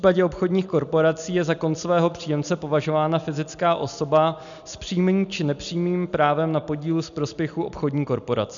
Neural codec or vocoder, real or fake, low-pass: none; real; 7.2 kHz